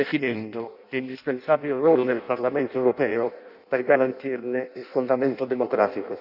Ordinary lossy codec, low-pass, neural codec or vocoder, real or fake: none; 5.4 kHz; codec, 16 kHz in and 24 kHz out, 0.6 kbps, FireRedTTS-2 codec; fake